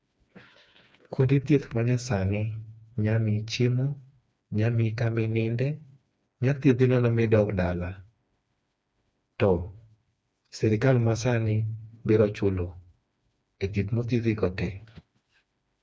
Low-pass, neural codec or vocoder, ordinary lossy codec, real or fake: none; codec, 16 kHz, 2 kbps, FreqCodec, smaller model; none; fake